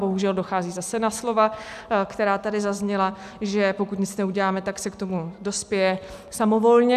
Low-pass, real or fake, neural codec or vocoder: 14.4 kHz; real; none